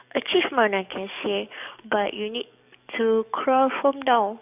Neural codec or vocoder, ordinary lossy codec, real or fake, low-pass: codec, 44.1 kHz, 7.8 kbps, DAC; none; fake; 3.6 kHz